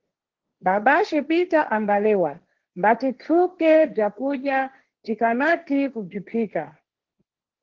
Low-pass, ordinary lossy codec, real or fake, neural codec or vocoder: 7.2 kHz; Opus, 16 kbps; fake; codec, 16 kHz, 1.1 kbps, Voila-Tokenizer